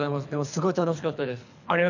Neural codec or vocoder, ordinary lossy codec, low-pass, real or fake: codec, 24 kHz, 3 kbps, HILCodec; none; 7.2 kHz; fake